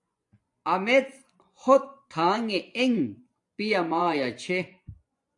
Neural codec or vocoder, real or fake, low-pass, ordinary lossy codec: vocoder, 24 kHz, 100 mel bands, Vocos; fake; 10.8 kHz; AAC, 64 kbps